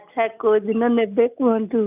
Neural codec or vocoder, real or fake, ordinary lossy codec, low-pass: none; real; none; 3.6 kHz